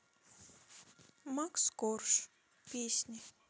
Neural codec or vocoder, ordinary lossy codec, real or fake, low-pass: none; none; real; none